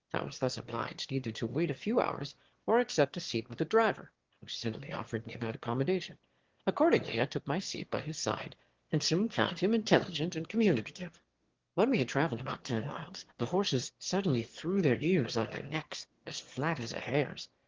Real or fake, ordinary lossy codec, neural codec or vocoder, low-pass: fake; Opus, 16 kbps; autoencoder, 22.05 kHz, a latent of 192 numbers a frame, VITS, trained on one speaker; 7.2 kHz